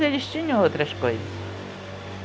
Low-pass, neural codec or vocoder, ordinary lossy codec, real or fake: none; none; none; real